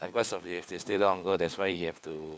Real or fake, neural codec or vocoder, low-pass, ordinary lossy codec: fake; codec, 16 kHz, 4 kbps, FunCodec, trained on LibriTTS, 50 frames a second; none; none